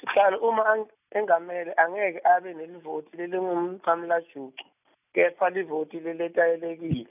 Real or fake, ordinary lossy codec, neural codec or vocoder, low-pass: real; none; none; 3.6 kHz